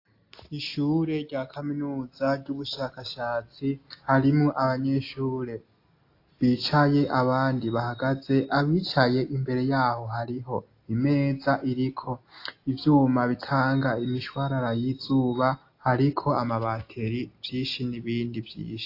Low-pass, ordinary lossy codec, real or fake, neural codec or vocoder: 5.4 kHz; AAC, 32 kbps; real; none